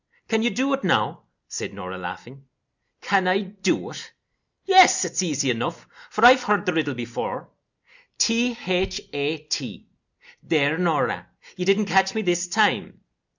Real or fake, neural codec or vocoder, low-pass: real; none; 7.2 kHz